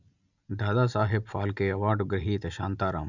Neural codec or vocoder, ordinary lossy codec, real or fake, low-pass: none; none; real; 7.2 kHz